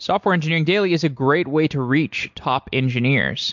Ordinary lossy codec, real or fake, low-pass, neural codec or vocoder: MP3, 64 kbps; real; 7.2 kHz; none